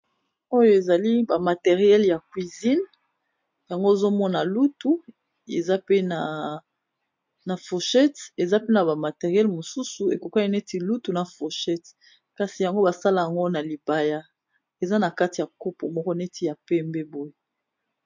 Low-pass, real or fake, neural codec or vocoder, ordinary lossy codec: 7.2 kHz; real; none; MP3, 48 kbps